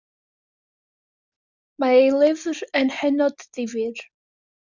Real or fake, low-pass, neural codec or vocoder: real; 7.2 kHz; none